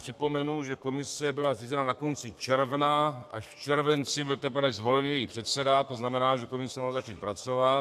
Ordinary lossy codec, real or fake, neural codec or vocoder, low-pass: AAC, 96 kbps; fake; codec, 32 kHz, 1.9 kbps, SNAC; 14.4 kHz